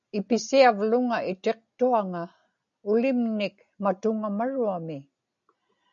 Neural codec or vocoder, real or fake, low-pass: none; real; 7.2 kHz